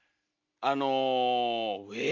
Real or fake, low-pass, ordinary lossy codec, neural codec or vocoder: real; 7.2 kHz; AAC, 48 kbps; none